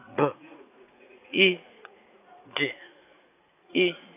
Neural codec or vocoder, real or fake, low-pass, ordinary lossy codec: vocoder, 44.1 kHz, 80 mel bands, Vocos; fake; 3.6 kHz; AAC, 32 kbps